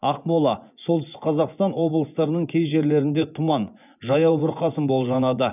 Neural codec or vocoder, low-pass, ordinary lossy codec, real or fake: vocoder, 24 kHz, 100 mel bands, Vocos; 3.6 kHz; none; fake